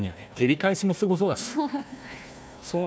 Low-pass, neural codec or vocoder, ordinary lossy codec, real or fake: none; codec, 16 kHz, 1 kbps, FunCodec, trained on Chinese and English, 50 frames a second; none; fake